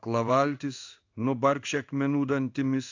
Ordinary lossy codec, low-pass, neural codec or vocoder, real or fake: AAC, 48 kbps; 7.2 kHz; codec, 16 kHz in and 24 kHz out, 1 kbps, XY-Tokenizer; fake